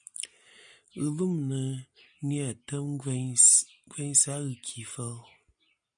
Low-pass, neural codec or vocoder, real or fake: 9.9 kHz; none; real